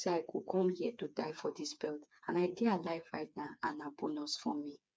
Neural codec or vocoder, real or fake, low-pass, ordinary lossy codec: codec, 16 kHz, 4 kbps, FreqCodec, smaller model; fake; none; none